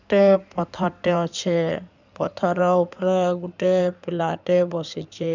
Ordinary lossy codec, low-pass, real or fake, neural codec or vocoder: none; 7.2 kHz; fake; codec, 16 kHz in and 24 kHz out, 2.2 kbps, FireRedTTS-2 codec